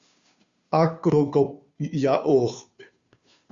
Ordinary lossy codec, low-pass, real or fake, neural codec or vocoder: Opus, 64 kbps; 7.2 kHz; fake; codec, 16 kHz, 2 kbps, FunCodec, trained on Chinese and English, 25 frames a second